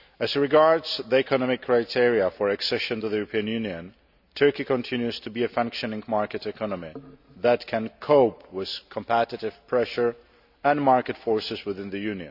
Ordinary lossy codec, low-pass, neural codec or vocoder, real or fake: none; 5.4 kHz; none; real